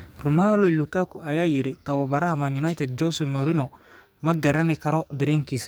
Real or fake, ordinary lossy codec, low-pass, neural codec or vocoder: fake; none; none; codec, 44.1 kHz, 2.6 kbps, SNAC